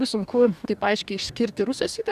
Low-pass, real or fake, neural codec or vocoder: 14.4 kHz; fake; codec, 44.1 kHz, 2.6 kbps, DAC